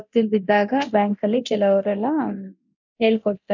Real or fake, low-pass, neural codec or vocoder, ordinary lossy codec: fake; 7.2 kHz; codec, 24 kHz, 0.9 kbps, DualCodec; none